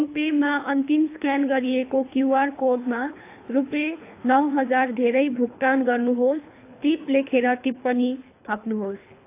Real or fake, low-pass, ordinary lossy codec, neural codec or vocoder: fake; 3.6 kHz; AAC, 24 kbps; codec, 24 kHz, 3 kbps, HILCodec